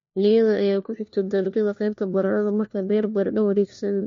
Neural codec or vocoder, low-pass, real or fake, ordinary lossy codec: codec, 16 kHz, 1 kbps, FunCodec, trained on LibriTTS, 50 frames a second; 7.2 kHz; fake; MP3, 48 kbps